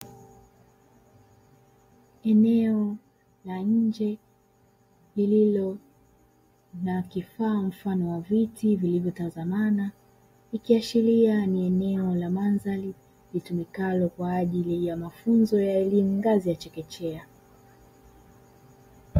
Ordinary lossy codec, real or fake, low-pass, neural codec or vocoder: AAC, 48 kbps; real; 19.8 kHz; none